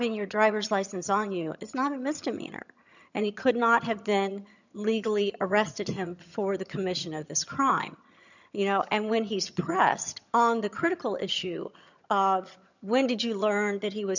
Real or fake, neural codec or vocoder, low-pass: fake; vocoder, 22.05 kHz, 80 mel bands, HiFi-GAN; 7.2 kHz